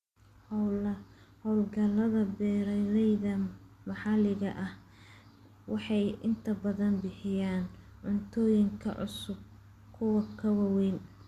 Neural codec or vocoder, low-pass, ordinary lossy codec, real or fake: none; 14.4 kHz; Opus, 64 kbps; real